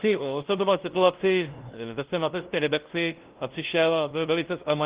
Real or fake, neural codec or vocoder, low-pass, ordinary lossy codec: fake; codec, 16 kHz, 0.5 kbps, FunCodec, trained on LibriTTS, 25 frames a second; 3.6 kHz; Opus, 16 kbps